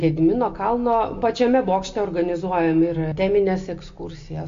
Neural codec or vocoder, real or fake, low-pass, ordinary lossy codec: none; real; 7.2 kHz; AAC, 48 kbps